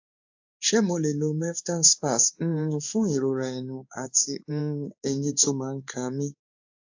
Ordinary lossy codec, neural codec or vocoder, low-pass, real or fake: AAC, 48 kbps; codec, 16 kHz in and 24 kHz out, 1 kbps, XY-Tokenizer; 7.2 kHz; fake